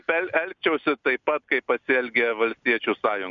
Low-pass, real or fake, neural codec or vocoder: 7.2 kHz; real; none